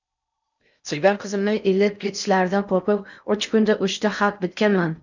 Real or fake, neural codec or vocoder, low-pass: fake; codec, 16 kHz in and 24 kHz out, 0.6 kbps, FocalCodec, streaming, 4096 codes; 7.2 kHz